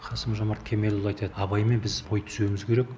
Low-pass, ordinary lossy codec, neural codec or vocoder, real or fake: none; none; none; real